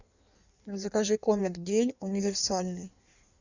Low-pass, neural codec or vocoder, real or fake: 7.2 kHz; codec, 16 kHz in and 24 kHz out, 1.1 kbps, FireRedTTS-2 codec; fake